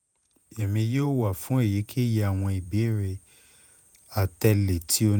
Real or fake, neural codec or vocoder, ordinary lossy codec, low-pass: fake; vocoder, 48 kHz, 128 mel bands, Vocos; none; none